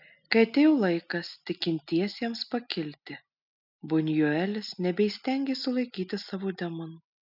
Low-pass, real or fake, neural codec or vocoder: 5.4 kHz; real; none